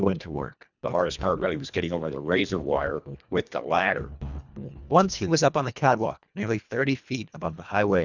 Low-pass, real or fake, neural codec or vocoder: 7.2 kHz; fake; codec, 24 kHz, 1.5 kbps, HILCodec